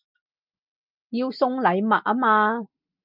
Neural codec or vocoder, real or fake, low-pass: none; real; 5.4 kHz